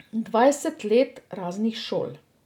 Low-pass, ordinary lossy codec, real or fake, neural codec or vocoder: 19.8 kHz; none; real; none